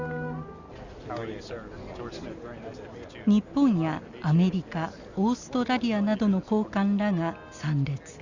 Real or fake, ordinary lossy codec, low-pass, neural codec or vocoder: real; Opus, 64 kbps; 7.2 kHz; none